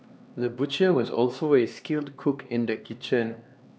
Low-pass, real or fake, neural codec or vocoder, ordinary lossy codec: none; fake; codec, 16 kHz, 2 kbps, X-Codec, HuBERT features, trained on LibriSpeech; none